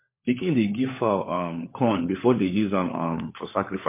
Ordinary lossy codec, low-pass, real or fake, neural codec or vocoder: MP3, 24 kbps; 3.6 kHz; fake; codec, 16 kHz, 4 kbps, FunCodec, trained on LibriTTS, 50 frames a second